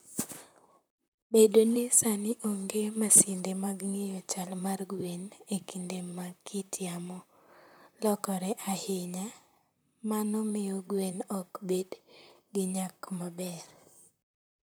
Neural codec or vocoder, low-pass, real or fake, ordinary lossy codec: vocoder, 44.1 kHz, 128 mel bands, Pupu-Vocoder; none; fake; none